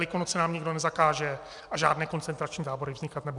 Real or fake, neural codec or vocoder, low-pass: real; none; 10.8 kHz